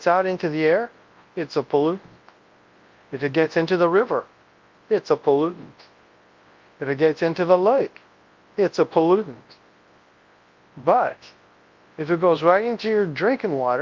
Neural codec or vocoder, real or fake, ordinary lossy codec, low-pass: codec, 24 kHz, 0.9 kbps, WavTokenizer, large speech release; fake; Opus, 32 kbps; 7.2 kHz